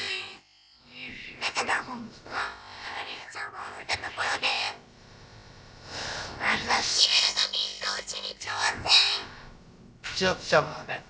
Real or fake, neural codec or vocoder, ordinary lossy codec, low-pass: fake; codec, 16 kHz, about 1 kbps, DyCAST, with the encoder's durations; none; none